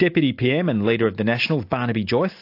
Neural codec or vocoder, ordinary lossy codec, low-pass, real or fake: none; AAC, 32 kbps; 5.4 kHz; real